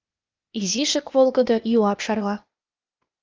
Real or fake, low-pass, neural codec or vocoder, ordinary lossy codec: fake; 7.2 kHz; codec, 16 kHz, 0.8 kbps, ZipCodec; Opus, 24 kbps